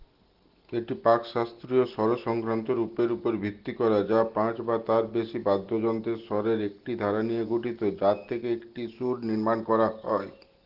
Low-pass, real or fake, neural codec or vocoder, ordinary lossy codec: 5.4 kHz; real; none; Opus, 16 kbps